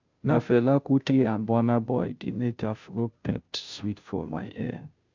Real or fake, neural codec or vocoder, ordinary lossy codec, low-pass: fake; codec, 16 kHz, 0.5 kbps, FunCodec, trained on Chinese and English, 25 frames a second; MP3, 64 kbps; 7.2 kHz